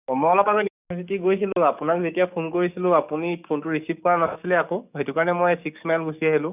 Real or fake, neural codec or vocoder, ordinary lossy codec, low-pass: fake; codec, 44.1 kHz, 7.8 kbps, DAC; none; 3.6 kHz